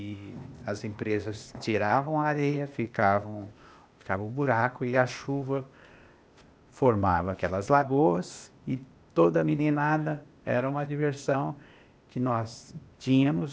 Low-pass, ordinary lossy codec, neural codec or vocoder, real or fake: none; none; codec, 16 kHz, 0.8 kbps, ZipCodec; fake